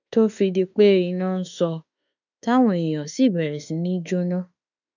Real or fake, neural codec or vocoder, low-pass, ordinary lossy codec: fake; autoencoder, 48 kHz, 32 numbers a frame, DAC-VAE, trained on Japanese speech; 7.2 kHz; none